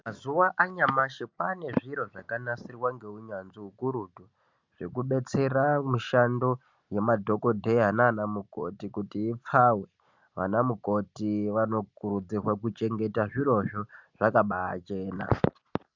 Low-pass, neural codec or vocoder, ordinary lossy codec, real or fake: 7.2 kHz; none; MP3, 64 kbps; real